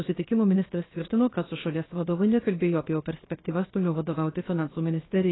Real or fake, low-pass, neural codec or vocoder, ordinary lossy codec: fake; 7.2 kHz; codec, 16 kHz, 0.7 kbps, FocalCodec; AAC, 16 kbps